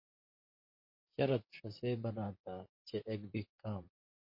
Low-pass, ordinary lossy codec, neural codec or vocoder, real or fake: 5.4 kHz; MP3, 32 kbps; vocoder, 44.1 kHz, 128 mel bands, Pupu-Vocoder; fake